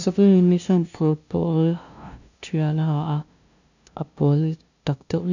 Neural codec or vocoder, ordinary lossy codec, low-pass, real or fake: codec, 16 kHz, 0.5 kbps, FunCodec, trained on LibriTTS, 25 frames a second; none; 7.2 kHz; fake